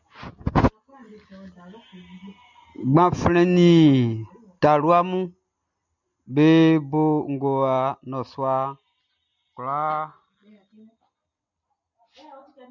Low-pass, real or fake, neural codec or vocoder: 7.2 kHz; real; none